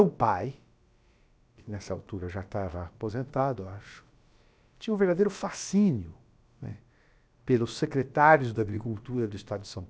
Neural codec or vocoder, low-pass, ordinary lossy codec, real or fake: codec, 16 kHz, about 1 kbps, DyCAST, with the encoder's durations; none; none; fake